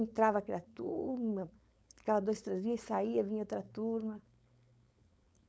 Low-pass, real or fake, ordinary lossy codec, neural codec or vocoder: none; fake; none; codec, 16 kHz, 4.8 kbps, FACodec